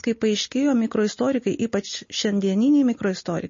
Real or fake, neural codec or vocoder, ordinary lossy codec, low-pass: real; none; MP3, 32 kbps; 7.2 kHz